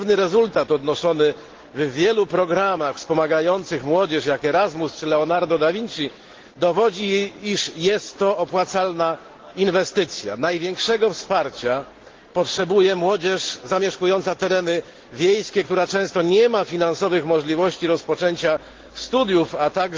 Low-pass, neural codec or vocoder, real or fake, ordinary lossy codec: 7.2 kHz; none; real; Opus, 16 kbps